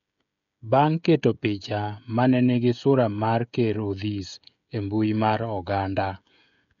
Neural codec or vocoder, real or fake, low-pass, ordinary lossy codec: codec, 16 kHz, 16 kbps, FreqCodec, smaller model; fake; 7.2 kHz; none